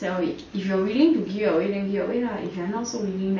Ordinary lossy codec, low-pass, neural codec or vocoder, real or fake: MP3, 32 kbps; 7.2 kHz; none; real